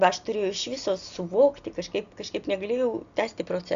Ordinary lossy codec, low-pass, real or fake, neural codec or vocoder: Opus, 64 kbps; 7.2 kHz; real; none